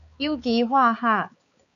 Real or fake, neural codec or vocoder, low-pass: fake; codec, 16 kHz, 4 kbps, X-Codec, HuBERT features, trained on balanced general audio; 7.2 kHz